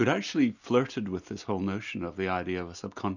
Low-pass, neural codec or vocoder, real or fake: 7.2 kHz; none; real